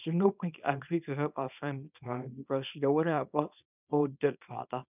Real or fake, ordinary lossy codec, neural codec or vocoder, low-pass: fake; none; codec, 24 kHz, 0.9 kbps, WavTokenizer, small release; 3.6 kHz